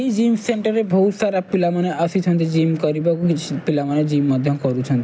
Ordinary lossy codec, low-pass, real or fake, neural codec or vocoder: none; none; real; none